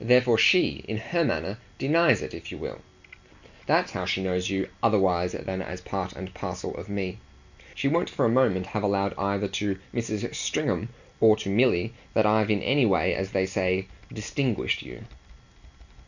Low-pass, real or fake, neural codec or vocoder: 7.2 kHz; real; none